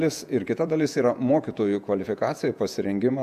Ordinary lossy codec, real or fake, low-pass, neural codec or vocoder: AAC, 96 kbps; fake; 14.4 kHz; vocoder, 48 kHz, 128 mel bands, Vocos